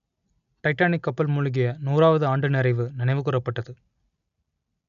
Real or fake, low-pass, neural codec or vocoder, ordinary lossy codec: real; 7.2 kHz; none; none